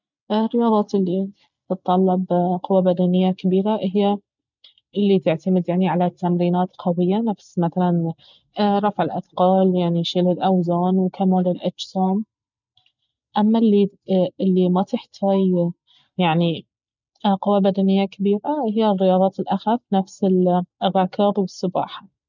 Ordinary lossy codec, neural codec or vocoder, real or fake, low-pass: none; none; real; 7.2 kHz